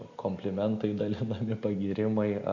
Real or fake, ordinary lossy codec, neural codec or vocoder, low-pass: real; MP3, 64 kbps; none; 7.2 kHz